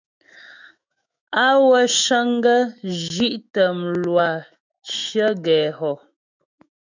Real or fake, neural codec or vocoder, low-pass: fake; autoencoder, 48 kHz, 128 numbers a frame, DAC-VAE, trained on Japanese speech; 7.2 kHz